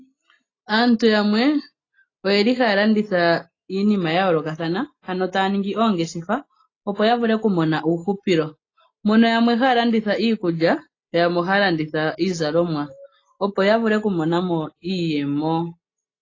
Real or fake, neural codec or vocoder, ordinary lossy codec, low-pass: real; none; AAC, 32 kbps; 7.2 kHz